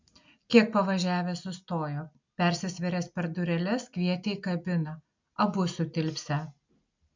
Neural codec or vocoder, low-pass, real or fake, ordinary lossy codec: none; 7.2 kHz; real; MP3, 64 kbps